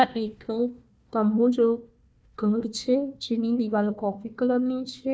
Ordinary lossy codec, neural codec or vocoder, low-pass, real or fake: none; codec, 16 kHz, 1 kbps, FunCodec, trained on Chinese and English, 50 frames a second; none; fake